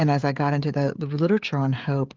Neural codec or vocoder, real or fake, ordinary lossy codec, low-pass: codec, 16 kHz, 16 kbps, FreqCodec, smaller model; fake; Opus, 24 kbps; 7.2 kHz